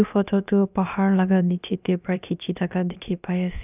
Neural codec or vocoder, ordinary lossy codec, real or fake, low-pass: codec, 16 kHz, about 1 kbps, DyCAST, with the encoder's durations; none; fake; 3.6 kHz